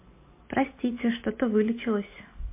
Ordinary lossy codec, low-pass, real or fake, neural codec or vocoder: MP3, 24 kbps; 3.6 kHz; real; none